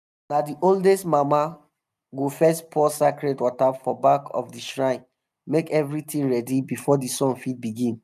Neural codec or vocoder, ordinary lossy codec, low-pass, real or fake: none; none; 14.4 kHz; real